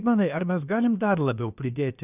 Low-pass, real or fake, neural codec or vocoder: 3.6 kHz; fake; codec, 16 kHz, about 1 kbps, DyCAST, with the encoder's durations